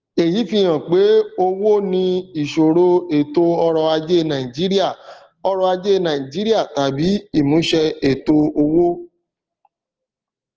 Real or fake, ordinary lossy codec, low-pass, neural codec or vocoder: real; Opus, 16 kbps; 7.2 kHz; none